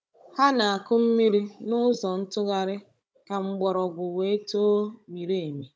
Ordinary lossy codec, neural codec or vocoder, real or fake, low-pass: none; codec, 16 kHz, 16 kbps, FunCodec, trained on Chinese and English, 50 frames a second; fake; none